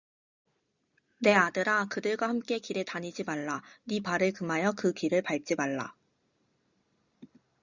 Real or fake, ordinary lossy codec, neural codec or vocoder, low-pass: real; Opus, 64 kbps; none; 7.2 kHz